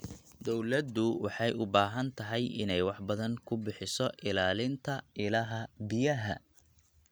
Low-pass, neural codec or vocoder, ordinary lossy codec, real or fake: none; none; none; real